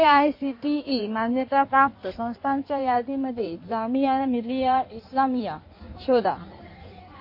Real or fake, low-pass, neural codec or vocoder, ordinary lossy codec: fake; 5.4 kHz; codec, 16 kHz in and 24 kHz out, 1.1 kbps, FireRedTTS-2 codec; MP3, 32 kbps